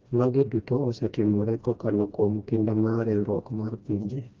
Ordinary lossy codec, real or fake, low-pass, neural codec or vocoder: Opus, 32 kbps; fake; 7.2 kHz; codec, 16 kHz, 1 kbps, FreqCodec, smaller model